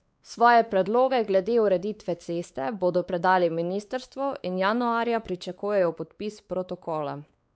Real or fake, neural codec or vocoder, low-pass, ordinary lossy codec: fake; codec, 16 kHz, 4 kbps, X-Codec, WavLM features, trained on Multilingual LibriSpeech; none; none